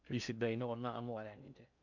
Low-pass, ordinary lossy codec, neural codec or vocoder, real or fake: 7.2 kHz; none; codec, 16 kHz in and 24 kHz out, 0.6 kbps, FocalCodec, streaming, 2048 codes; fake